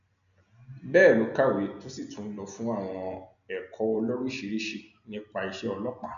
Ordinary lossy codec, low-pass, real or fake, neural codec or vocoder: none; 7.2 kHz; real; none